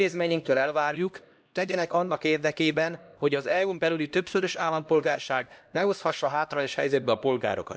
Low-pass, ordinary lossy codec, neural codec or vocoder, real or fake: none; none; codec, 16 kHz, 1 kbps, X-Codec, HuBERT features, trained on LibriSpeech; fake